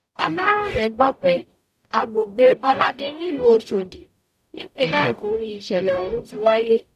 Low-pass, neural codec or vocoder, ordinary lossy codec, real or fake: 14.4 kHz; codec, 44.1 kHz, 0.9 kbps, DAC; none; fake